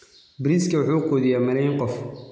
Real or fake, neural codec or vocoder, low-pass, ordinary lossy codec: real; none; none; none